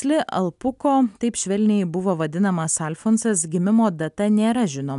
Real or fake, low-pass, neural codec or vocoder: real; 10.8 kHz; none